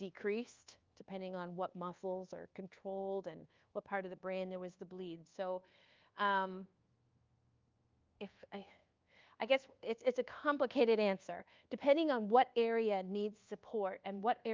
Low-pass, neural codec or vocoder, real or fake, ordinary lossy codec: 7.2 kHz; codec, 24 kHz, 1.2 kbps, DualCodec; fake; Opus, 24 kbps